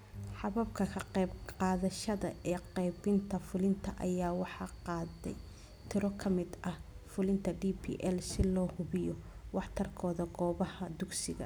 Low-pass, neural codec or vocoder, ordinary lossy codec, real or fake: none; none; none; real